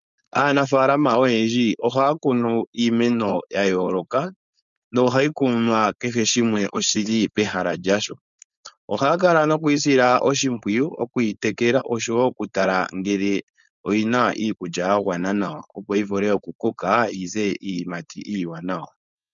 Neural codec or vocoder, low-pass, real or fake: codec, 16 kHz, 4.8 kbps, FACodec; 7.2 kHz; fake